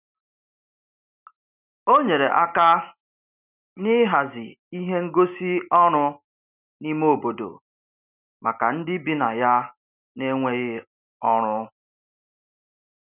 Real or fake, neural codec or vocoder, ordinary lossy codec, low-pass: real; none; none; 3.6 kHz